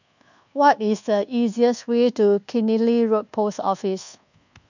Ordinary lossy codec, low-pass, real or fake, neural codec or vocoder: none; 7.2 kHz; fake; codec, 24 kHz, 1.2 kbps, DualCodec